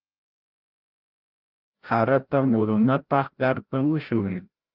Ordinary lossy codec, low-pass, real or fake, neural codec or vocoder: Opus, 24 kbps; 5.4 kHz; fake; codec, 16 kHz, 0.5 kbps, FreqCodec, larger model